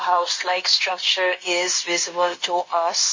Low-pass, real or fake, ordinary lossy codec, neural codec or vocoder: 7.2 kHz; fake; MP3, 32 kbps; codec, 16 kHz, 0.9 kbps, LongCat-Audio-Codec